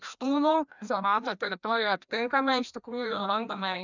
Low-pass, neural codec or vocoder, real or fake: 7.2 kHz; codec, 16 kHz, 1 kbps, FreqCodec, larger model; fake